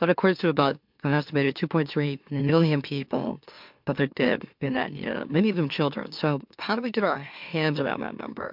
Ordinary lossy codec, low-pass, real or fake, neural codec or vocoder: MP3, 48 kbps; 5.4 kHz; fake; autoencoder, 44.1 kHz, a latent of 192 numbers a frame, MeloTTS